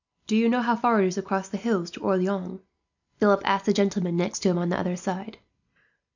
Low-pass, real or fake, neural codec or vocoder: 7.2 kHz; real; none